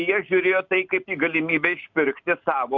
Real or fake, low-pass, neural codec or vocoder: real; 7.2 kHz; none